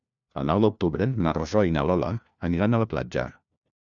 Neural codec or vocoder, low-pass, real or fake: codec, 16 kHz, 1 kbps, FunCodec, trained on LibriTTS, 50 frames a second; 7.2 kHz; fake